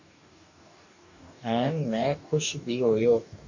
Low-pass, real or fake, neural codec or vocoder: 7.2 kHz; fake; codec, 44.1 kHz, 2.6 kbps, DAC